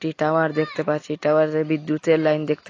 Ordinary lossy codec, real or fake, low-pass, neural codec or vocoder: AAC, 32 kbps; real; 7.2 kHz; none